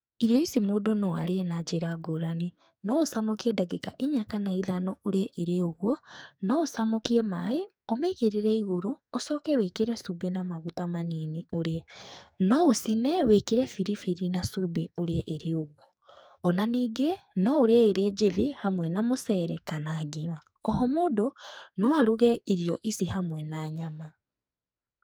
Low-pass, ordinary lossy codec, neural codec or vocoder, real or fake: none; none; codec, 44.1 kHz, 2.6 kbps, SNAC; fake